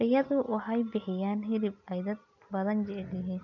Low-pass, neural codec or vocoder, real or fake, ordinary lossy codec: 7.2 kHz; none; real; none